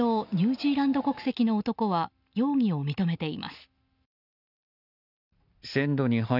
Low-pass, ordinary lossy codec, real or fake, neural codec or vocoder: 5.4 kHz; none; real; none